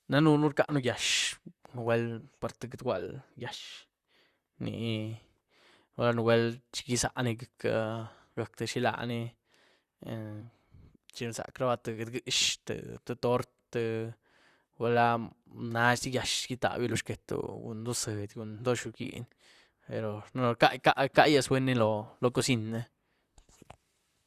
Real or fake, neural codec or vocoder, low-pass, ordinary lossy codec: fake; vocoder, 44.1 kHz, 128 mel bands, Pupu-Vocoder; 14.4 kHz; Opus, 64 kbps